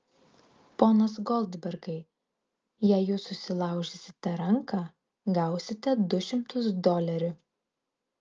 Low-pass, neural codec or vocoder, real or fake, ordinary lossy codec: 7.2 kHz; none; real; Opus, 24 kbps